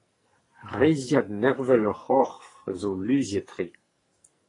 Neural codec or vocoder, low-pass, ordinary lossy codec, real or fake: codec, 44.1 kHz, 2.6 kbps, SNAC; 10.8 kHz; AAC, 32 kbps; fake